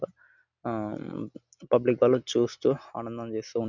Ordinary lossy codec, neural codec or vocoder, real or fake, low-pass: none; none; real; 7.2 kHz